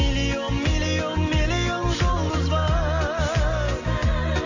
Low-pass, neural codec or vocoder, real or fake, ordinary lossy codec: 7.2 kHz; none; real; AAC, 48 kbps